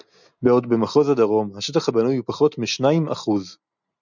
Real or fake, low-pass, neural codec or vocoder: real; 7.2 kHz; none